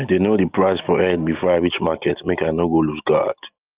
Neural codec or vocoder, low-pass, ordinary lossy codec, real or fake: none; 3.6 kHz; Opus, 32 kbps; real